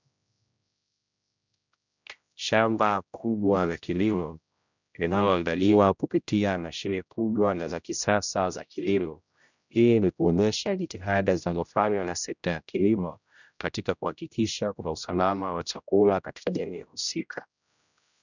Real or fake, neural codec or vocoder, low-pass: fake; codec, 16 kHz, 0.5 kbps, X-Codec, HuBERT features, trained on general audio; 7.2 kHz